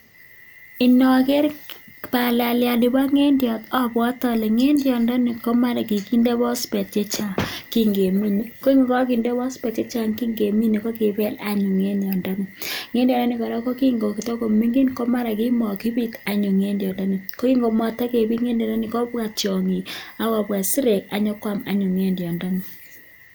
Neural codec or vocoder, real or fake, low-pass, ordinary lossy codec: none; real; none; none